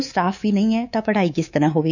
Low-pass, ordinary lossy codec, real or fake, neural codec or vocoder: 7.2 kHz; none; fake; codec, 24 kHz, 3.1 kbps, DualCodec